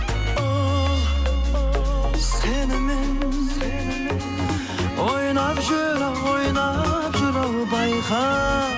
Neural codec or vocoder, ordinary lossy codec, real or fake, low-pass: none; none; real; none